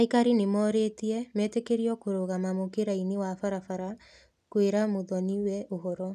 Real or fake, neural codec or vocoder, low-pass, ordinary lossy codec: real; none; 14.4 kHz; none